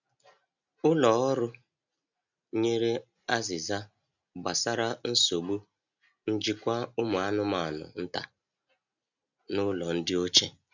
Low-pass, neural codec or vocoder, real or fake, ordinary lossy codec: 7.2 kHz; none; real; none